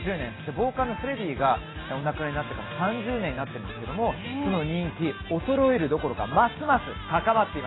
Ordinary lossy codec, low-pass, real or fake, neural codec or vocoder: AAC, 16 kbps; 7.2 kHz; real; none